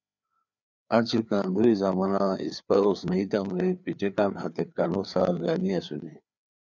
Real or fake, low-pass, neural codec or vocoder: fake; 7.2 kHz; codec, 16 kHz, 4 kbps, FreqCodec, larger model